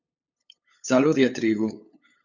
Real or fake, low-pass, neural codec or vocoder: fake; 7.2 kHz; codec, 16 kHz, 8 kbps, FunCodec, trained on LibriTTS, 25 frames a second